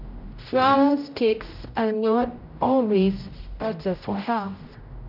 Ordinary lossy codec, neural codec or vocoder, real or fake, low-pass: none; codec, 16 kHz, 0.5 kbps, X-Codec, HuBERT features, trained on general audio; fake; 5.4 kHz